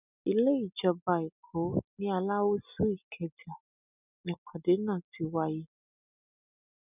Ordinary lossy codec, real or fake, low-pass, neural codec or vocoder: none; real; 3.6 kHz; none